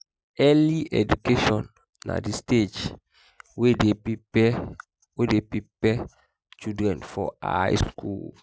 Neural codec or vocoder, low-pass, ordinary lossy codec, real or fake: none; none; none; real